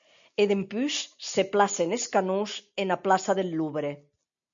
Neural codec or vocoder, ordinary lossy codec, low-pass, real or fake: none; MP3, 64 kbps; 7.2 kHz; real